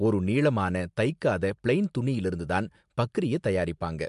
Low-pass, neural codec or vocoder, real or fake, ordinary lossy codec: 10.8 kHz; none; real; MP3, 64 kbps